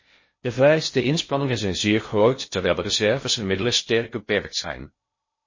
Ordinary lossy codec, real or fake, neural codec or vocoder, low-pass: MP3, 32 kbps; fake; codec, 16 kHz in and 24 kHz out, 0.6 kbps, FocalCodec, streaming, 2048 codes; 7.2 kHz